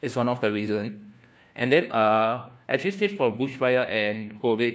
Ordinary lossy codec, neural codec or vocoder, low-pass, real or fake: none; codec, 16 kHz, 1 kbps, FunCodec, trained on LibriTTS, 50 frames a second; none; fake